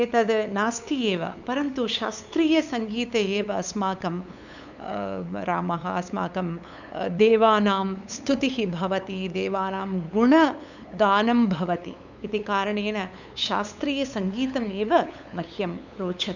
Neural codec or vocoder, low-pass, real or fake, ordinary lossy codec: codec, 16 kHz, 8 kbps, FunCodec, trained on LibriTTS, 25 frames a second; 7.2 kHz; fake; none